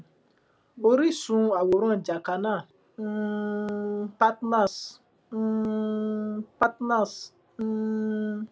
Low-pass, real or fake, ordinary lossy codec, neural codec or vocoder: none; real; none; none